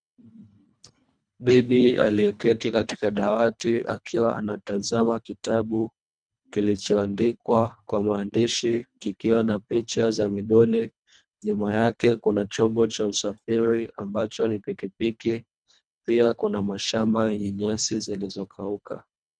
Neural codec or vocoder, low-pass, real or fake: codec, 24 kHz, 1.5 kbps, HILCodec; 9.9 kHz; fake